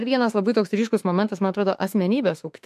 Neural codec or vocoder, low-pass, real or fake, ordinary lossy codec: autoencoder, 48 kHz, 32 numbers a frame, DAC-VAE, trained on Japanese speech; 14.4 kHz; fake; MP3, 64 kbps